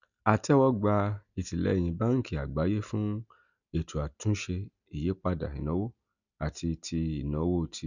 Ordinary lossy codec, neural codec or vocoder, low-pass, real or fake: none; none; 7.2 kHz; real